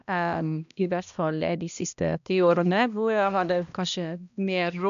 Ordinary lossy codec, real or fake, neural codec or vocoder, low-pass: none; fake; codec, 16 kHz, 1 kbps, X-Codec, HuBERT features, trained on balanced general audio; 7.2 kHz